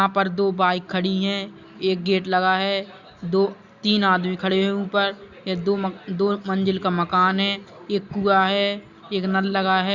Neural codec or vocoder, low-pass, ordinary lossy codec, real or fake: none; 7.2 kHz; Opus, 64 kbps; real